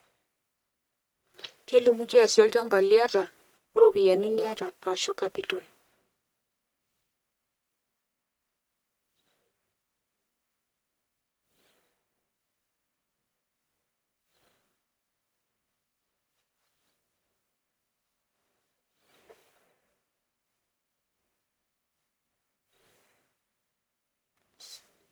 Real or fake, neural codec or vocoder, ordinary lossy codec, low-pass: fake; codec, 44.1 kHz, 1.7 kbps, Pupu-Codec; none; none